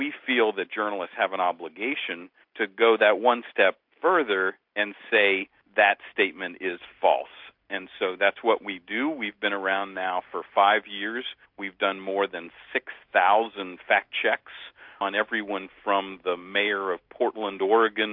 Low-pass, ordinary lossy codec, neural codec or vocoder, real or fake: 5.4 kHz; MP3, 48 kbps; none; real